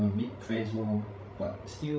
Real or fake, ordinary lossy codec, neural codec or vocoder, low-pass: fake; none; codec, 16 kHz, 16 kbps, FreqCodec, larger model; none